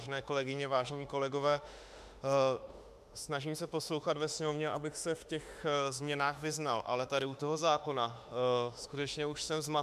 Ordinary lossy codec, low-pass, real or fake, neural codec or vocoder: MP3, 96 kbps; 14.4 kHz; fake; autoencoder, 48 kHz, 32 numbers a frame, DAC-VAE, trained on Japanese speech